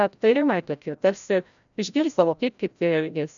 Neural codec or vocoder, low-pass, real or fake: codec, 16 kHz, 0.5 kbps, FreqCodec, larger model; 7.2 kHz; fake